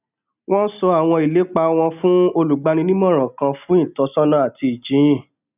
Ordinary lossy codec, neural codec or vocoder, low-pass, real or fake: none; none; 3.6 kHz; real